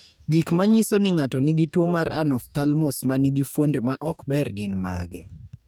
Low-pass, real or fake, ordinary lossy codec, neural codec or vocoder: none; fake; none; codec, 44.1 kHz, 2.6 kbps, DAC